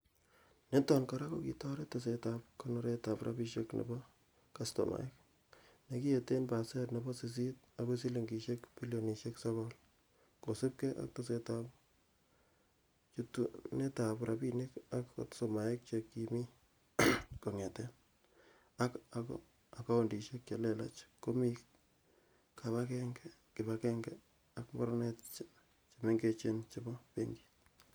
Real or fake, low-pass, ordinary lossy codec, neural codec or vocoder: real; none; none; none